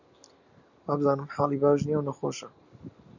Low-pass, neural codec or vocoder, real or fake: 7.2 kHz; none; real